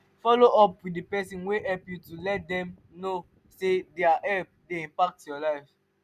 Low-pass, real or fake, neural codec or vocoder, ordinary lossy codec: 14.4 kHz; real; none; none